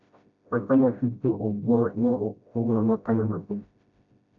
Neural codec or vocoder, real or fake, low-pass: codec, 16 kHz, 0.5 kbps, FreqCodec, smaller model; fake; 7.2 kHz